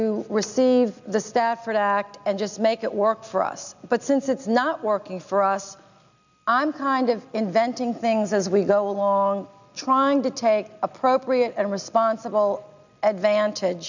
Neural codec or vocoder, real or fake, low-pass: none; real; 7.2 kHz